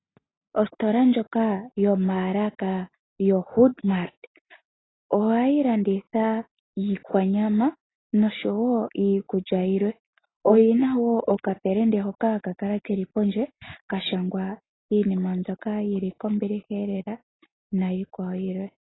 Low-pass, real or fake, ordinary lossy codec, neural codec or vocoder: 7.2 kHz; real; AAC, 16 kbps; none